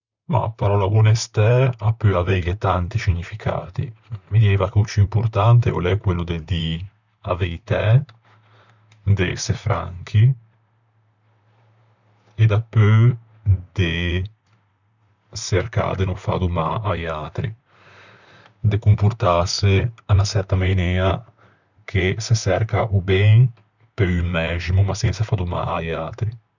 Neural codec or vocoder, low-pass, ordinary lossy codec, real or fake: vocoder, 44.1 kHz, 128 mel bands, Pupu-Vocoder; 7.2 kHz; none; fake